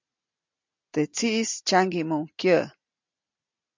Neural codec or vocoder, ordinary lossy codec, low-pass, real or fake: none; MP3, 64 kbps; 7.2 kHz; real